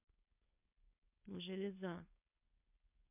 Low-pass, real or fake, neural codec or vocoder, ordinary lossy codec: 3.6 kHz; fake; codec, 16 kHz, 4.8 kbps, FACodec; MP3, 32 kbps